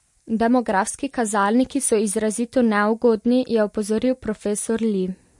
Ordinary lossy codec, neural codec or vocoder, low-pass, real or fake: MP3, 48 kbps; none; 10.8 kHz; real